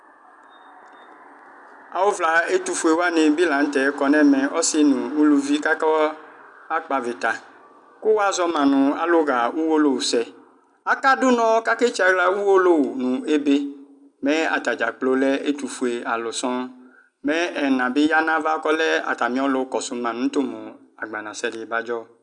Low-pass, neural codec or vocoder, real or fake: 10.8 kHz; none; real